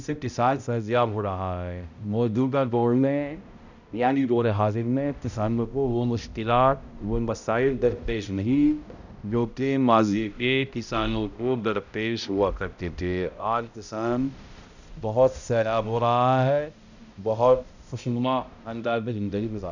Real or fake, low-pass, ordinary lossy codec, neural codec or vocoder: fake; 7.2 kHz; none; codec, 16 kHz, 0.5 kbps, X-Codec, HuBERT features, trained on balanced general audio